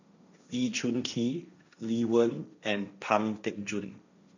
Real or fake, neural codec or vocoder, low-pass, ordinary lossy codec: fake; codec, 16 kHz, 1.1 kbps, Voila-Tokenizer; 7.2 kHz; none